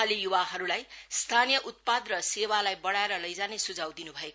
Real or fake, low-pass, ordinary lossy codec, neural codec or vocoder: real; none; none; none